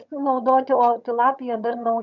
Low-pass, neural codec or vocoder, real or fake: 7.2 kHz; vocoder, 22.05 kHz, 80 mel bands, HiFi-GAN; fake